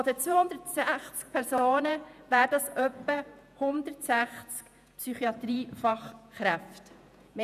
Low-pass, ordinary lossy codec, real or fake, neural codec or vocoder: 14.4 kHz; none; fake; vocoder, 48 kHz, 128 mel bands, Vocos